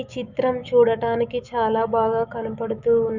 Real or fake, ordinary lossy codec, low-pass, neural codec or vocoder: fake; none; 7.2 kHz; autoencoder, 48 kHz, 128 numbers a frame, DAC-VAE, trained on Japanese speech